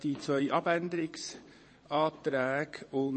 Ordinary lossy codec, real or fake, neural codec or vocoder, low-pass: MP3, 32 kbps; fake; vocoder, 22.05 kHz, 80 mel bands, WaveNeXt; 9.9 kHz